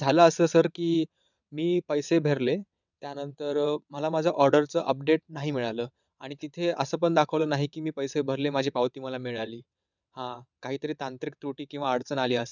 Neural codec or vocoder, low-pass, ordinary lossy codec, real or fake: vocoder, 22.05 kHz, 80 mel bands, WaveNeXt; 7.2 kHz; none; fake